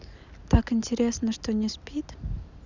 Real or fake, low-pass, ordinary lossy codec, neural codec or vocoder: real; 7.2 kHz; none; none